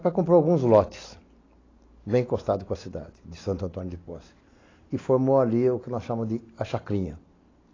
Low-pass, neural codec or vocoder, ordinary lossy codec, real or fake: 7.2 kHz; none; AAC, 32 kbps; real